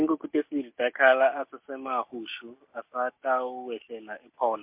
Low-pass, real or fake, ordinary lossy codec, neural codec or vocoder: 3.6 kHz; real; MP3, 32 kbps; none